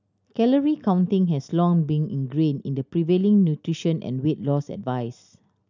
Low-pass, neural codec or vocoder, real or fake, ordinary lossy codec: 7.2 kHz; none; real; none